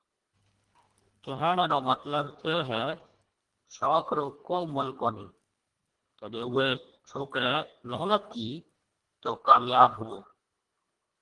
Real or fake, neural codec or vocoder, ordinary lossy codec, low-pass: fake; codec, 24 kHz, 1.5 kbps, HILCodec; Opus, 24 kbps; 10.8 kHz